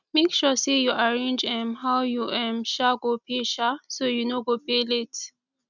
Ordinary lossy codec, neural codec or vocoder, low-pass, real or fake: none; none; 7.2 kHz; real